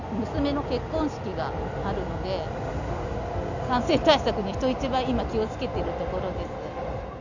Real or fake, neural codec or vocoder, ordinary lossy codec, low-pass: real; none; none; 7.2 kHz